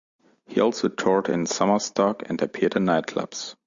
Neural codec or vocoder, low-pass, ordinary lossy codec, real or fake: none; 7.2 kHz; Opus, 64 kbps; real